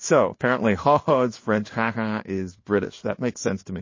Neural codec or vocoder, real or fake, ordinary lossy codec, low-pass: codec, 16 kHz in and 24 kHz out, 1 kbps, XY-Tokenizer; fake; MP3, 32 kbps; 7.2 kHz